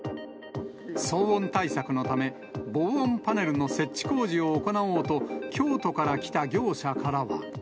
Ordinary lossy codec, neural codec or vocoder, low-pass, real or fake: none; none; none; real